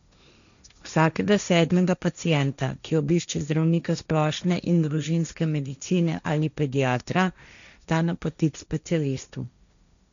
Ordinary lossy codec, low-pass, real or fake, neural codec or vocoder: none; 7.2 kHz; fake; codec, 16 kHz, 1.1 kbps, Voila-Tokenizer